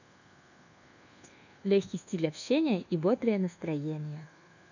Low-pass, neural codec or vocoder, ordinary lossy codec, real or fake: 7.2 kHz; codec, 24 kHz, 1.2 kbps, DualCodec; none; fake